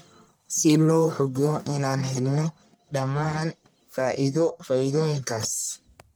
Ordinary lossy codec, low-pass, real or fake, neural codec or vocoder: none; none; fake; codec, 44.1 kHz, 1.7 kbps, Pupu-Codec